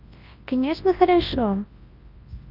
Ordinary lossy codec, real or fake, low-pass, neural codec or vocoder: Opus, 24 kbps; fake; 5.4 kHz; codec, 24 kHz, 0.9 kbps, WavTokenizer, large speech release